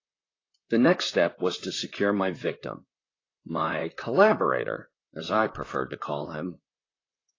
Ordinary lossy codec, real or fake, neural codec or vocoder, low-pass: AAC, 32 kbps; fake; vocoder, 44.1 kHz, 128 mel bands, Pupu-Vocoder; 7.2 kHz